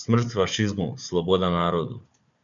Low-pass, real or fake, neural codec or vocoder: 7.2 kHz; fake; codec, 16 kHz, 4 kbps, FunCodec, trained on Chinese and English, 50 frames a second